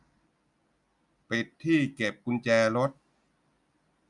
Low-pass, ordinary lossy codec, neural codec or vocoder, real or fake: 10.8 kHz; none; none; real